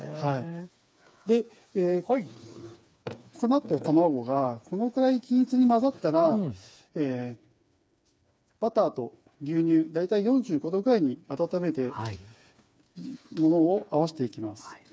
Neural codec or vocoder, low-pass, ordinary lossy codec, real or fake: codec, 16 kHz, 4 kbps, FreqCodec, smaller model; none; none; fake